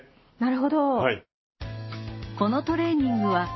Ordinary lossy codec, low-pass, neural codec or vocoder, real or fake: MP3, 24 kbps; 7.2 kHz; none; real